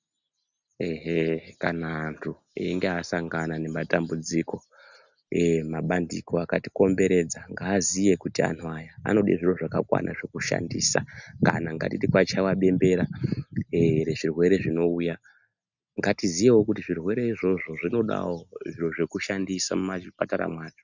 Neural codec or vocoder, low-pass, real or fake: none; 7.2 kHz; real